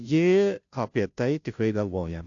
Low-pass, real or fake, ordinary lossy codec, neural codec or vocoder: 7.2 kHz; fake; AAC, 64 kbps; codec, 16 kHz, 0.5 kbps, FunCodec, trained on Chinese and English, 25 frames a second